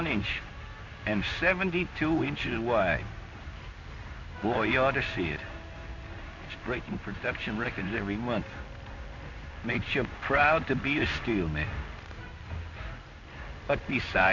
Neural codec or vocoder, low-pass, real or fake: codec, 16 kHz in and 24 kHz out, 1 kbps, XY-Tokenizer; 7.2 kHz; fake